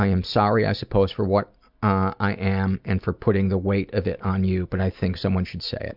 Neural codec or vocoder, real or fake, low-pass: none; real; 5.4 kHz